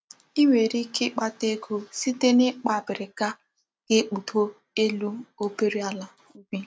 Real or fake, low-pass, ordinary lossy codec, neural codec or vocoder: real; none; none; none